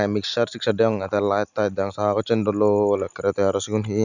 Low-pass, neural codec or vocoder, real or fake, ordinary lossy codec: 7.2 kHz; none; real; none